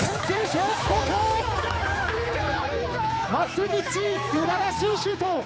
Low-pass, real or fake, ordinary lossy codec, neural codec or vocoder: none; fake; none; codec, 16 kHz, 4 kbps, X-Codec, HuBERT features, trained on general audio